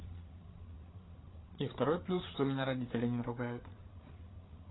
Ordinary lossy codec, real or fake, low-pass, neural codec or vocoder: AAC, 16 kbps; fake; 7.2 kHz; codec, 16 kHz, 8 kbps, FreqCodec, larger model